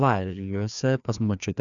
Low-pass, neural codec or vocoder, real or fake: 7.2 kHz; codec, 16 kHz, 2 kbps, FreqCodec, larger model; fake